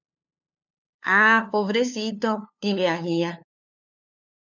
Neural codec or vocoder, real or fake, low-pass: codec, 16 kHz, 2 kbps, FunCodec, trained on LibriTTS, 25 frames a second; fake; 7.2 kHz